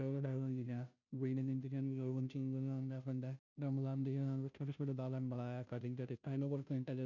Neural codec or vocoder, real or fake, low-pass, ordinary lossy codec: codec, 16 kHz, 0.5 kbps, FunCodec, trained on Chinese and English, 25 frames a second; fake; 7.2 kHz; none